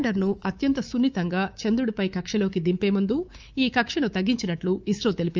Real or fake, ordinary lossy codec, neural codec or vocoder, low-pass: fake; Opus, 24 kbps; codec, 24 kHz, 3.1 kbps, DualCodec; 7.2 kHz